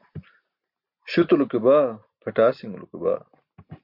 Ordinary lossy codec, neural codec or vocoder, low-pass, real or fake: MP3, 48 kbps; none; 5.4 kHz; real